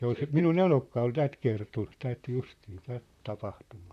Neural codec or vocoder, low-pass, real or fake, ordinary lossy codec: vocoder, 44.1 kHz, 128 mel bands, Pupu-Vocoder; 14.4 kHz; fake; none